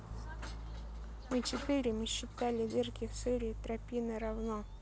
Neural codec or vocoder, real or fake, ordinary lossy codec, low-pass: none; real; none; none